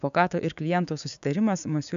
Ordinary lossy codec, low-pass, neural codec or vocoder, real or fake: MP3, 96 kbps; 7.2 kHz; codec, 16 kHz, 6 kbps, DAC; fake